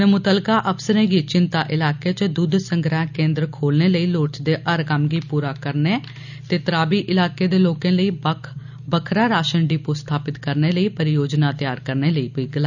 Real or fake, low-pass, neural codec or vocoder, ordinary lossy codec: real; 7.2 kHz; none; none